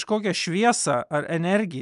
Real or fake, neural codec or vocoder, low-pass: real; none; 10.8 kHz